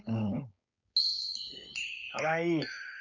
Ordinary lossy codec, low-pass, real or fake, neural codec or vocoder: none; 7.2 kHz; fake; codec, 16 kHz, 4.8 kbps, FACodec